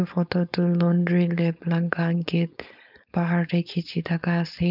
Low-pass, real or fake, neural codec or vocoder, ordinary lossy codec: 5.4 kHz; fake; codec, 16 kHz, 4.8 kbps, FACodec; none